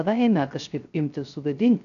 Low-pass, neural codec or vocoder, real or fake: 7.2 kHz; codec, 16 kHz, 0.3 kbps, FocalCodec; fake